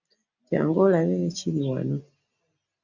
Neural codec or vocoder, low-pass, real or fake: none; 7.2 kHz; real